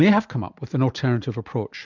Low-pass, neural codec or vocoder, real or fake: 7.2 kHz; none; real